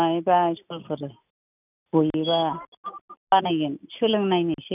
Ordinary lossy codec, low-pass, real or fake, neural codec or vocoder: none; 3.6 kHz; real; none